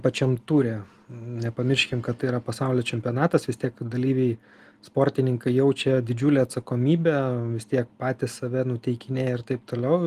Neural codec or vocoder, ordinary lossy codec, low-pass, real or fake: none; Opus, 24 kbps; 14.4 kHz; real